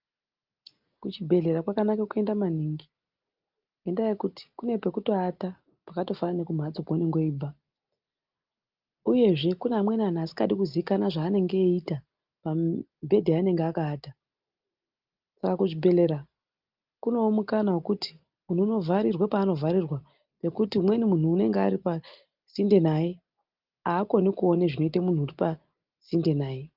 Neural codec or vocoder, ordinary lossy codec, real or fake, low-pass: none; Opus, 24 kbps; real; 5.4 kHz